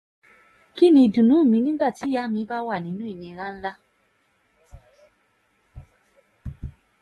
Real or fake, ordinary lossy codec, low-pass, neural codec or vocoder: fake; AAC, 32 kbps; 19.8 kHz; codec, 44.1 kHz, 7.8 kbps, DAC